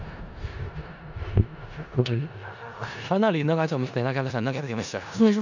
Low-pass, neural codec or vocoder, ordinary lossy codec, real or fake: 7.2 kHz; codec, 16 kHz in and 24 kHz out, 0.4 kbps, LongCat-Audio-Codec, four codebook decoder; none; fake